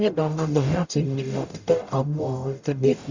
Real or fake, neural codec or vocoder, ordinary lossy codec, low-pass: fake; codec, 44.1 kHz, 0.9 kbps, DAC; Opus, 64 kbps; 7.2 kHz